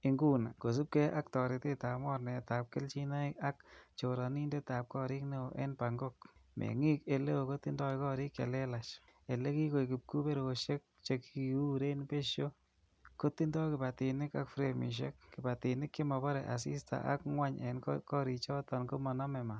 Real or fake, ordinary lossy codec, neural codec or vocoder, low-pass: real; none; none; none